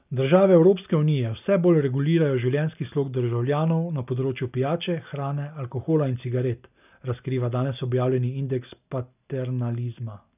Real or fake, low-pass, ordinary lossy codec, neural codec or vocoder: real; 3.6 kHz; none; none